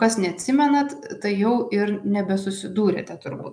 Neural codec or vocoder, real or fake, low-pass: none; real; 9.9 kHz